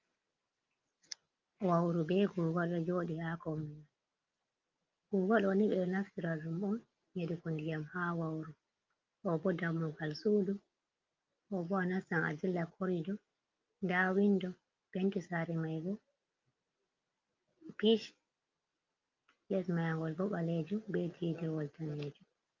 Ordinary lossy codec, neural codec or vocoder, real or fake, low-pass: Opus, 24 kbps; none; real; 7.2 kHz